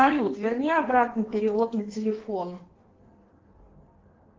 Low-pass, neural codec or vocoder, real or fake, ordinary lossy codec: 7.2 kHz; codec, 16 kHz in and 24 kHz out, 1.1 kbps, FireRedTTS-2 codec; fake; Opus, 16 kbps